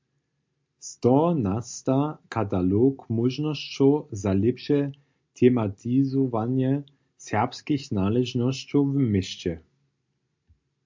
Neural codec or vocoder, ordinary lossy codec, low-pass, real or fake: none; MP3, 64 kbps; 7.2 kHz; real